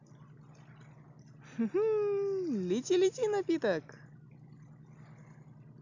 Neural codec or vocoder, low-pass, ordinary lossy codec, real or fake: none; 7.2 kHz; none; real